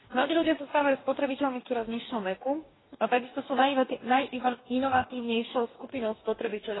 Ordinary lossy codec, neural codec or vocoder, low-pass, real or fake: AAC, 16 kbps; codec, 44.1 kHz, 2.6 kbps, DAC; 7.2 kHz; fake